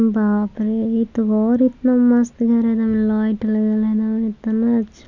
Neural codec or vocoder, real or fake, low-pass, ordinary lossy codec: none; real; 7.2 kHz; none